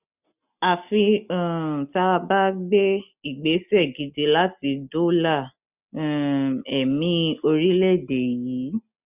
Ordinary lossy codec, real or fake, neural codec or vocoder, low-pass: none; real; none; 3.6 kHz